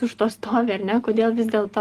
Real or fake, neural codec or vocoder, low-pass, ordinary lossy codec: real; none; 14.4 kHz; Opus, 24 kbps